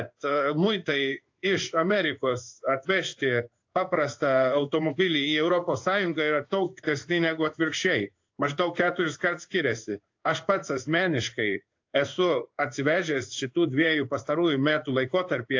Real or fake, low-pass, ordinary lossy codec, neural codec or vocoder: fake; 7.2 kHz; AAC, 48 kbps; codec, 16 kHz in and 24 kHz out, 1 kbps, XY-Tokenizer